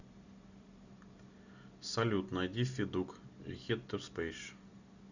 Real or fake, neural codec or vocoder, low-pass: real; none; 7.2 kHz